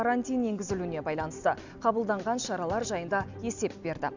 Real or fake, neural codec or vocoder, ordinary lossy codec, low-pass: real; none; none; 7.2 kHz